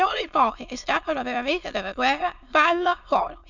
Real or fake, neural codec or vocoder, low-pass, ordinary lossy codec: fake; autoencoder, 22.05 kHz, a latent of 192 numbers a frame, VITS, trained on many speakers; 7.2 kHz; none